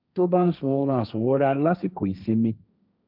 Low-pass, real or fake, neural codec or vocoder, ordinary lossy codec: 5.4 kHz; fake; codec, 16 kHz, 1.1 kbps, Voila-Tokenizer; none